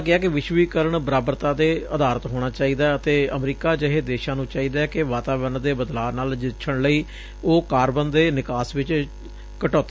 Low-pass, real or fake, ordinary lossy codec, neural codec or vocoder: none; real; none; none